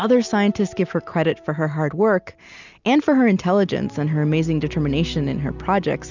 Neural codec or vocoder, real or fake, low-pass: none; real; 7.2 kHz